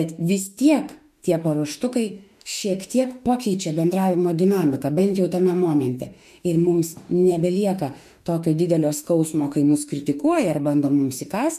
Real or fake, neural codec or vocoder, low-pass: fake; autoencoder, 48 kHz, 32 numbers a frame, DAC-VAE, trained on Japanese speech; 14.4 kHz